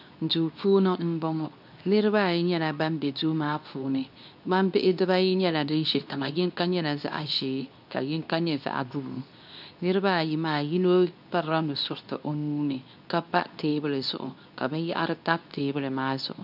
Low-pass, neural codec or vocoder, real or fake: 5.4 kHz; codec, 24 kHz, 0.9 kbps, WavTokenizer, medium speech release version 2; fake